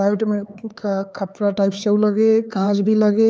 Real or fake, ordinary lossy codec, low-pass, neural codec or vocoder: fake; none; none; codec, 16 kHz, 4 kbps, X-Codec, HuBERT features, trained on LibriSpeech